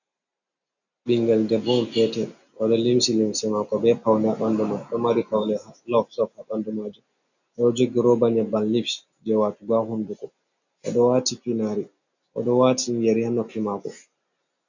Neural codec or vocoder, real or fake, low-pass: none; real; 7.2 kHz